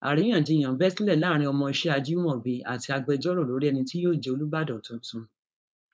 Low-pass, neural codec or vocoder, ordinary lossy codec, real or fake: none; codec, 16 kHz, 4.8 kbps, FACodec; none; fake